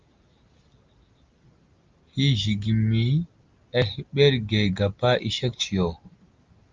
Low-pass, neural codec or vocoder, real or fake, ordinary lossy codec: 7.2 kHz; none; real; Opus, 24 kbps